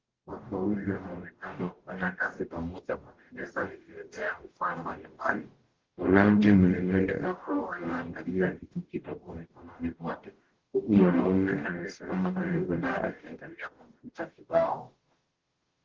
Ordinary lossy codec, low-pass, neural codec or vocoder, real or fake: Opus, 16 kbps; 7.2 kHz; codec, 44.1 kHz, 0.9 kbps, DAC; fake